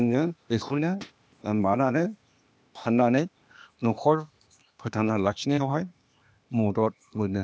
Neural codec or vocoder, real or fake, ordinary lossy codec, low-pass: codec, 16 kHz, 0.8 kbps, ZipCodec; fake; none; none